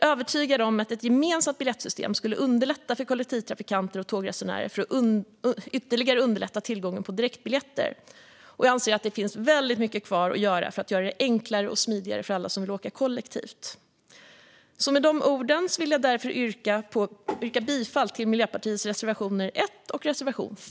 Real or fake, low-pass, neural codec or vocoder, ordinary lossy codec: real; none; none; none